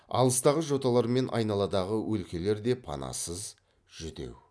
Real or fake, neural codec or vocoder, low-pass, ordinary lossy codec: real; none; none; none